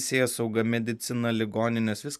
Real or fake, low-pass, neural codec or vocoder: fake; 14.4 kHz; vocoder, 44.1 kHz, 128 mel bands every 512 samples, BigVGAN v2